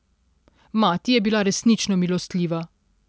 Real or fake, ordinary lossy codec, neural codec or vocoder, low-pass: real; none; none; none